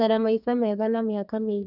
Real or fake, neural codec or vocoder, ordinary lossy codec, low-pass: fake; codec, 32 kHz, 1.9 kbps, SNAC; none; 5.4 kHz